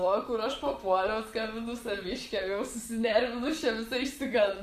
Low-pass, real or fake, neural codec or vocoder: 14.4 kHz; fake; vocoder, 44.1 kHz, 128 mel bands, Pupu-Vocoder